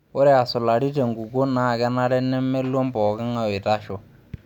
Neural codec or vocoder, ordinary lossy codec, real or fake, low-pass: none; none; real; 19.8 kHz